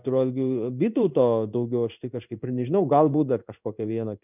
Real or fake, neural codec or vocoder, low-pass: fake; codec, 16 kHz in and 24 kHz out, 1 kbps, XY-Tokenizer; 3.6 kHz